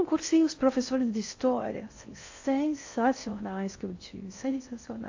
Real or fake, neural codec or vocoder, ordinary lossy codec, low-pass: fake; codec, 16 kHz in and 24 kHz out, 0.6 kbps, FocalCodec, streaming, 2048 codes; AAC, 48 kbps; 7.2 kHz